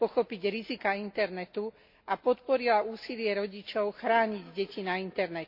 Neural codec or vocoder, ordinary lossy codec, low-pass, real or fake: none; MP3, 32 kbps; 5.4 kHz; real